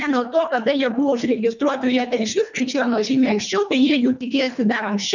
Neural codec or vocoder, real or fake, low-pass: codec, 24 kHz, 1.5 kbps, HILCodec; fake; 7.2 kHz